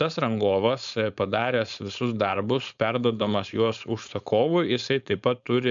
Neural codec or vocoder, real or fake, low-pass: codec, 16 kHz, 4.8 kbps, FACodec; fake; 7.2 kHz